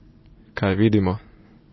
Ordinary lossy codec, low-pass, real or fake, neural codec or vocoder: MP3, 24 kbps; 7.2 kHz; real; none